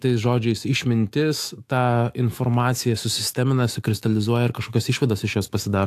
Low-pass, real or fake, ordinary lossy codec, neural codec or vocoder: 14.4 kHz; fake; AAC, 64 kbps; autoencoder, 48 kHz, 128 numbers a frame, DAC-VAE, trained on Japanese speech